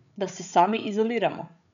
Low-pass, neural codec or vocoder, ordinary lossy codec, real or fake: 7.2 kHz; codec, 16 kHz, 8 kbps, FreqCodec, larger model; MP3, 96 kbps; fake